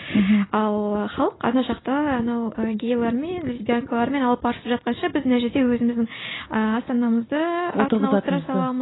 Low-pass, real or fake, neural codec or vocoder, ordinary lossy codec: 7.2 kHz; real; none; AAC, 16 kbps